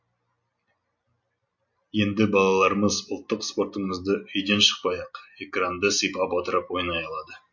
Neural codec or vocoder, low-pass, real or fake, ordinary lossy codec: none; 7.2 kHz; real; MP3, 48 kbps